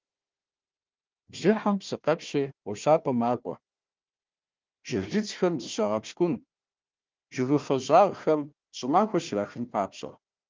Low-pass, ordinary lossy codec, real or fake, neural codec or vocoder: 7.2 kHz; Opus, 32 kbps; fake; codec, 16 kHz, 1 kbps, FunCodec, trained on Chinese and English, 50 frames a second